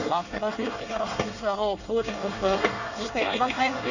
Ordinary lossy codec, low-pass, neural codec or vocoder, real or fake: none; 7.2 kHz; codec, 24 kHz, 1 kbps, SNAC; fake